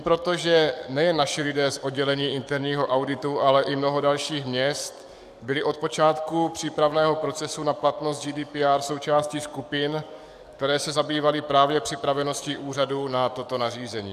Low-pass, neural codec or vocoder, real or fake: 14.4 kHz; codec, 44.1 kHz, 7.8 kbps, DAC; fake